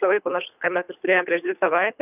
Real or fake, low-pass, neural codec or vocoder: fake; 3.6 kHz; codec, 24 kHz, 3 kbps, HILCodec